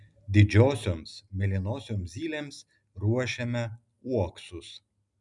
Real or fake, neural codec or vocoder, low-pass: real; none; 10.8 kHz